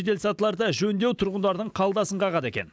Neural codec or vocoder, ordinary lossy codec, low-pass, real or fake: none; none; none; real